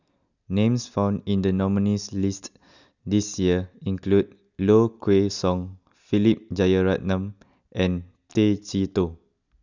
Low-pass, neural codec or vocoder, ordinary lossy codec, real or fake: 7.2 kHz; none; none; real